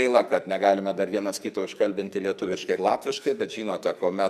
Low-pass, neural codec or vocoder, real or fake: 14.4 kHz; codec, 44.1 kHz, 2.6 kbps, SNAC; fake